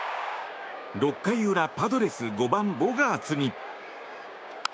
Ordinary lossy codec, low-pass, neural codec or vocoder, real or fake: none; none; codec, 16 kHz, 6 kbps, DAC; fake